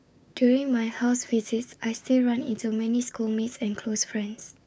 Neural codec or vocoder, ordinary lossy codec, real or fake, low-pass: codec, 16 kHz, 6 kbps, DAC; none; fake; none